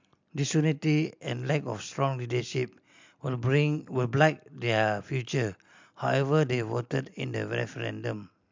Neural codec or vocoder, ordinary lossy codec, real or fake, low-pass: none; MP3, 64 kbps; real; 7.2 kHz